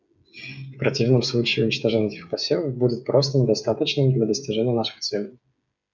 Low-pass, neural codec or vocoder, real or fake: 7.2 kHz; codec, 16 kHz, 8 kbps, FreqCodec, smaller model; fake